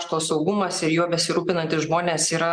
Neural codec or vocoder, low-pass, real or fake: none; 9.9 kHz; real